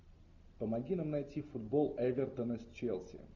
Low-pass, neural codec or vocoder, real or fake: 7.2 kHz; none; real